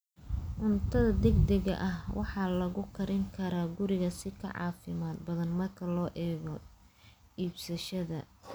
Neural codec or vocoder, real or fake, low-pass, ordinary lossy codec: none; real; none; none